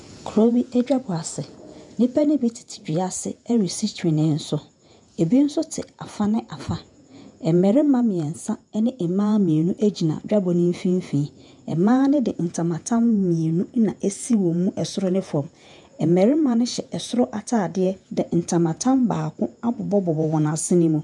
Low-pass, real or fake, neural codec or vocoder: 10.8 kHz; fake; vocoder, 48 kHz, 128 mel bands, Vocos